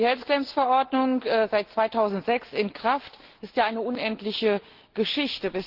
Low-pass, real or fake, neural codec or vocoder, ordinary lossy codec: 5.4 kHz; real; none; Opus, 16 kbps